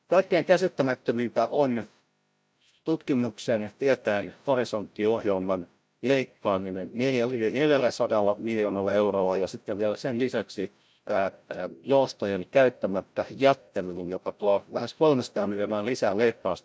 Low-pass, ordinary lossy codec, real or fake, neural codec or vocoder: none; none; fake; codec, 16 kHz, 0.5 kbps, FreqCodec, larger model